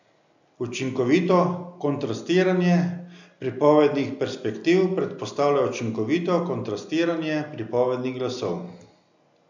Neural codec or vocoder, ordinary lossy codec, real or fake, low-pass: none; none; real; 7.2 kHz